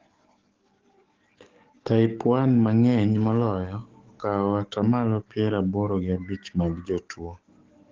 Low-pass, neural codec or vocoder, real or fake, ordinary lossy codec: 7.2 kHz; codec, 44.1 kHz, 7.8 kbps, DAC; fake; Opus, 16 kbps